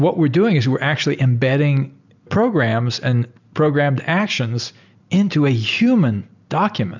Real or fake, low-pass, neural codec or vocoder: real; 7.2 kHz; none